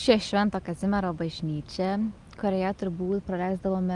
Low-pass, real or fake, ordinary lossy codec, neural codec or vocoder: 10.8 kHz; real; Opus, 32 kbps; none